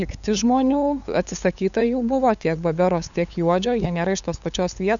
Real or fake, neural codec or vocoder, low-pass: fake; codec, 16 kHz, 8 kbps, FunCodec, trained on LibriTTS, 25 frames a second; 7.2 kHz